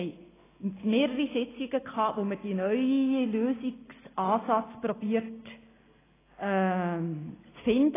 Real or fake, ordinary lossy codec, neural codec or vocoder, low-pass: real; AAC, 16 kbps; none; 3.6 kHz